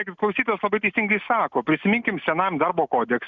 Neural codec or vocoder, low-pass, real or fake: none; 7.2 kHz; real